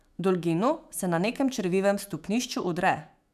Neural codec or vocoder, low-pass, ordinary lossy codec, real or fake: autoencoder, 48 kHz, 128 numbers a frame, DAC-VAE, trained on Japanese speech; 14.4 kHz; none; fake